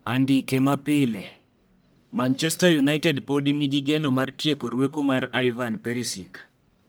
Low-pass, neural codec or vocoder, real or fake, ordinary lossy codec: none; codec, 44.1 kHz, 1.7 kbps, Pupu-Codec; fake; none